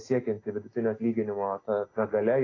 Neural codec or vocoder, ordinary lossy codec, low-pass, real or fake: none; AAC, 32 kbps; 7.2 kHz; real